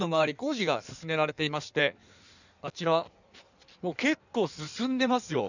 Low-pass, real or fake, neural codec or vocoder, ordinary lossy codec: 7.2 kHz; fake; codec, 16 kHz in and 24 kHz out, 1.1 kbps, FireRedTTS-2 codec; none